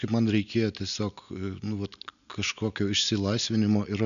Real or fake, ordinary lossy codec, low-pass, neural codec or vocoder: real; MP3, 96 kbps; 7.2 kHz; none